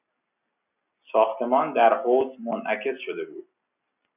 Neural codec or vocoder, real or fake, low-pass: none; real; 3.6 kHz